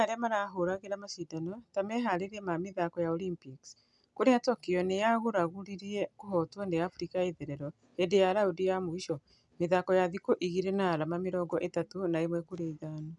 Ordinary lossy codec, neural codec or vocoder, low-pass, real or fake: none; none; 10.8 kHz; real